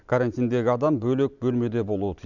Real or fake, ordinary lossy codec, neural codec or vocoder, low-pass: real; none; none; 7.2 kHz